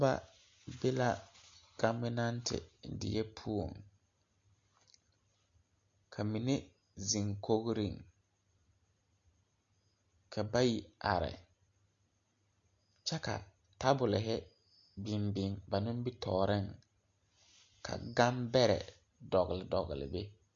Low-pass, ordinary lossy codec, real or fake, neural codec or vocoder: 7.2 kHz; MP3, 48 kbps; real; none